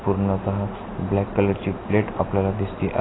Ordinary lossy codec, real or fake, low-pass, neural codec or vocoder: AAC, 16 kbps; real; 7.2 kHz; none